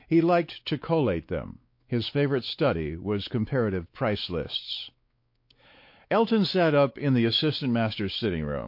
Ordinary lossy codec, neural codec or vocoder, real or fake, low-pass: MP3, 32 kbps; codec, 16 kHz, 4 kbps, X-Codec, WavLM features, trained on Multilingual LibriSpeech; fake; 5.4 kHz